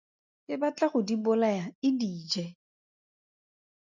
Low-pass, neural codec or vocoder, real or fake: 7.2 kHz; none; real